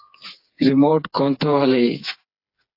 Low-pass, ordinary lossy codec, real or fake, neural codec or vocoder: 5.4 kHz; AAC, 32 kbps; fake; codec, 16 kHz, 4 kbps, FreqCodec, smaller model